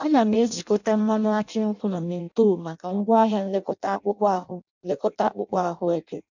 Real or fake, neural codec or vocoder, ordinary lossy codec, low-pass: fake; codec, 16 kHz in and 24 kHz out, 0.6 kbps, FireRedTTS-2 codec; none; 7.2 kHz